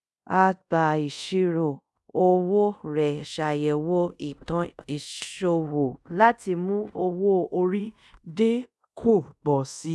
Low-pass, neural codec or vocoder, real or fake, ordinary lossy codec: none; codec, 24 kHz, 0.5 kbps, DualCodec; fake; none